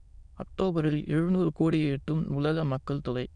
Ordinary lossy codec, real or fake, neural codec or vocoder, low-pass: none; fake; autoencoder, 22.05 kHz, a latent of 192 numbers a frame, VITS, trained on many speakers; 9.9 kHz